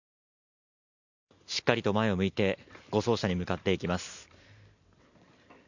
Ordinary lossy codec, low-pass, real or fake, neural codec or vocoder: none; 7.2 kHz; real; none